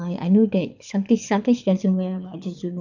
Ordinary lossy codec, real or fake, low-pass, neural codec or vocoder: none; fake; 7.2 kHz; codec, 24 kHz, 6 kbps, HILCodec